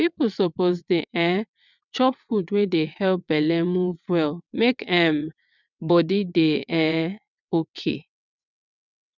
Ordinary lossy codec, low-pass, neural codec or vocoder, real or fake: none; 7.2 kHz; vocoder, 22.05 kHz, 80 mel bands, WaveNeXt; fake